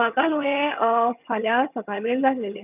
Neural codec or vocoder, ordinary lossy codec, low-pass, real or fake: vocoder, 22.05 kHz, 80 mel bands, HiFi-GAN; none; 3.6 kHz; fake